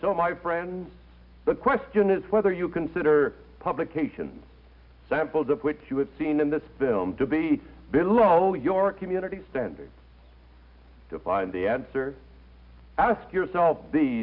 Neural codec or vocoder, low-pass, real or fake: none; 5.4 kHz; real